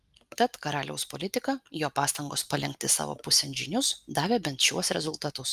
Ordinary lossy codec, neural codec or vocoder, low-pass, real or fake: Opus, 32 kbps; none; 14.4 kHz; real